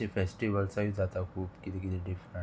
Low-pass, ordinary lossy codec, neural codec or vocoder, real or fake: none; none; none; real